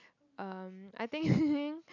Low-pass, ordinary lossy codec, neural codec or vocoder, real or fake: 7.2 kHz; none; none; real